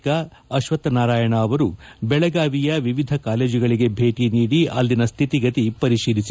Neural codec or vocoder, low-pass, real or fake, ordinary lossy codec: none; none; real; none